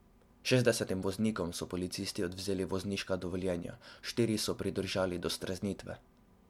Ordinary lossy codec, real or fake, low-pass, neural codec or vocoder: MP3, 96 kbps; real; 19.8 kHz; none